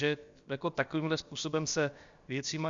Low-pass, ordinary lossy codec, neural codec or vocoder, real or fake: 7.2 kHz; Opus, 64 kbps; codec, 16 kHz, about 1 kbps, DyCAST, with the encoder's durations; fake